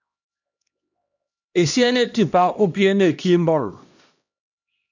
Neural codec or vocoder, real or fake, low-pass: codec, 16 kHz, 1 kbps, X-Codec, HuBERT features, trained on LibriSpeech; fake; 7.2 kHz